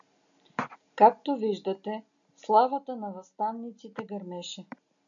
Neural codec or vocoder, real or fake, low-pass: none; real; 7.2 kHz